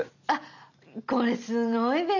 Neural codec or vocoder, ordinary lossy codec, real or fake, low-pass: none; none; real; 7.2 kHz